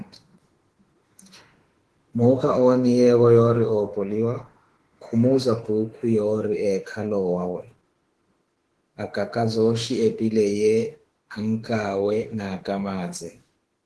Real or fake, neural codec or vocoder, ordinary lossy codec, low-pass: fake; autoencoder, 48 kHz, 32 numbers a frame, DAC-VAE, trained on Japanese speech; Opus, 16 kbps; 10.8 kHz